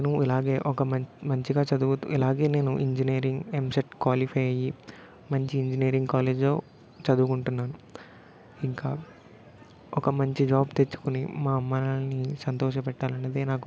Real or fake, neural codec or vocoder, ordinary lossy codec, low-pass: real; none; none; none